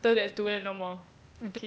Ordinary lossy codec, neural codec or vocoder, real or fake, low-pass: none; codec, 16 kHz, 0.8 kbps, ZipCodec; fake; none